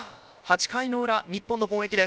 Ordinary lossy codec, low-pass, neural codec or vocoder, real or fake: none; none; codec, 16 kHz, about 1 kbps, DyCAST, with the encoder's durations; fake